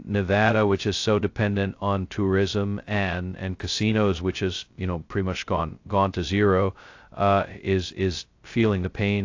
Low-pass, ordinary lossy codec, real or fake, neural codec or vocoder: 7.2 kHz; AAC, 48 kbps; fake; codec, 16 kHz, 0.2 kbps, FocalCodec